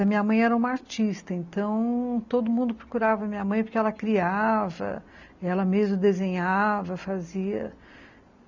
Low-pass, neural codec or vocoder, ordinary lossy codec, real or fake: 7.2 kHz; none; none; real